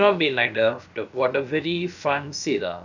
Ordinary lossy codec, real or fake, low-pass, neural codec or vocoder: none; fake; 7.2 kHz; codec, 16 kHz, about 1 kbps, DyCAST, with the encoder's durations